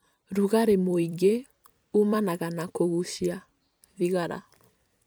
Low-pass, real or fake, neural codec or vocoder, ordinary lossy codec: none; fake; vocoder, 44.1 kHz, 128 mel bands every 256 samples, BigVGAN v2; none